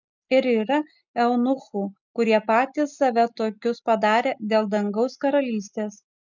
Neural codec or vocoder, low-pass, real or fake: none; 7.2 kHz; real